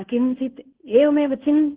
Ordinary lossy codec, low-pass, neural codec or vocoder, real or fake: Opus, 16 kbps; 3.6 kHz; codec, 16 kHz in and 24 kHz out, 1 kbps, XY-Tokenizer; fake